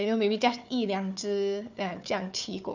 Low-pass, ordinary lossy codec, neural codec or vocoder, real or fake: 7.2 kHz; none; codec, 16 kHz, 4 kbps, FunCodec, trained on Chinese and English, 50 frames a second; fake